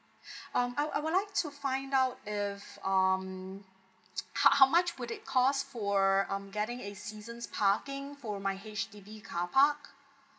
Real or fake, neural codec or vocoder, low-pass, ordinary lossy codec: real; none; none; none